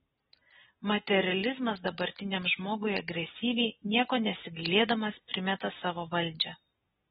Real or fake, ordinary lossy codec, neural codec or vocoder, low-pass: real; AAC, 16 kbps; none; 7.2 kHz